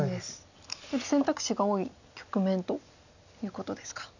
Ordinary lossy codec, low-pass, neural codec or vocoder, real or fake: none; 7.2 kHz; none; real